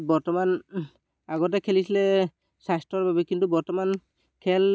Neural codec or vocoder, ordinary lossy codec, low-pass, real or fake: none; none; none; real